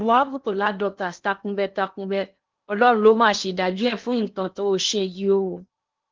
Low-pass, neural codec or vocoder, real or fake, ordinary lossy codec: 7.2 kHz; codec, 16 kHz in and 24 kHz out, 0.8 kbps, FocalCodec, streaming, 65536 codes; fake; Opus, 32 kbps